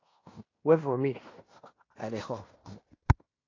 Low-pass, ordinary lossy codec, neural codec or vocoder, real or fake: 7.2 kHz; AAC, 32 kbps; codec, 16 kHz in and 24 kHz out, 0.9 kbps, LongCat-Audio-Codec, fine tuned four codebook decoder; fake